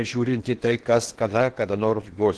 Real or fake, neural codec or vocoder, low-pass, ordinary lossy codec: fake; codec, 16 kHz in and 24 kHz out, 0.8 kbps, FocalCodec, streaming, 65536 codes; 10.8 kHz; Opus, 16 kbps